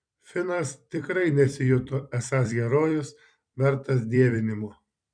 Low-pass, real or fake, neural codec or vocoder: 9.9 kHz; fake; vocoder, 44.1 kHz, 128 mel bands every 256 samples, BigVGAN v2